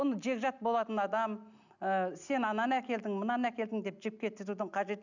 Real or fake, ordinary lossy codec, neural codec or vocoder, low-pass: real; none; none; 7.2 kHz